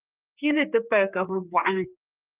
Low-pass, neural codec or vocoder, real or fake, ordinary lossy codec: 3.6 kHz; codec, 16 kHz, 4 kbps, X-Codec, HuBERT features, trained on general audio; fake; Opus, 24 kbps